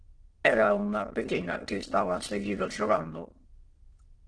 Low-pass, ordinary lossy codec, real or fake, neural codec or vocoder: 9.9 kHz; Opus, 16 kbps; fake; autoencoder, 22.05 kHz, a latent of 192 numbers a frame, VITS, trained on many speakers